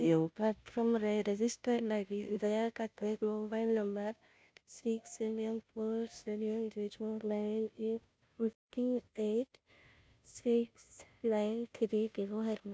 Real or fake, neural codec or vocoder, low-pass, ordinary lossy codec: fake; codec, 16 kHz, 0.5 kbps, FunCodec, trained on Chinese and English, 25 frames a second; none; none